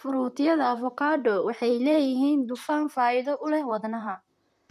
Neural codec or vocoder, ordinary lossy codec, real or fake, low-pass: vocoder, 44.1 kHz, 128 mel bands, Pupu-Vocoder; none; fake; 14.4 kHz